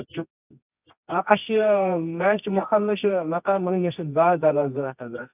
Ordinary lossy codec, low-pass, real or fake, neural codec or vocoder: none; 3.6 kHz; fake; codec, 24 kHz, 0.9 kbps, WavTokenizer, medium music audio release